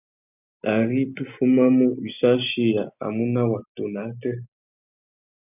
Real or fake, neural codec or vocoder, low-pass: real; none; 3.6 kHz